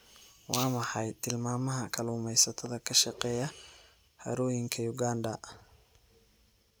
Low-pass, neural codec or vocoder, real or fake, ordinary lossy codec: none; none; real; none